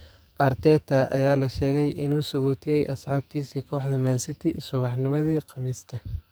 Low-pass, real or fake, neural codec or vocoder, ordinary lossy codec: none; fake; codec, 44.1 kHz, 2.6 kbps, SNAC; none